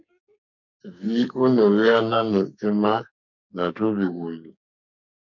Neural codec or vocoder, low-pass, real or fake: codec, 44.1 kHz, 2.6 kbps, SNAC; 7.2 kHz; fake